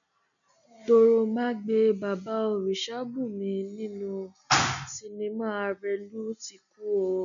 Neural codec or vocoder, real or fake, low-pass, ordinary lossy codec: none; real; 7.2 kHz; none